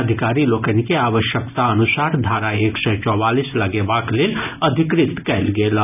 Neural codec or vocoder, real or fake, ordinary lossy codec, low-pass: none; real; none; 3.6 kHz